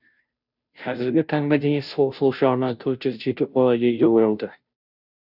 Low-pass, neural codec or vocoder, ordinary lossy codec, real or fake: 5.4 kHz; codec, 16 kHz, 0.5 kbps, FunCodec, trained on Chinese and English, 25 frames a second; none; fake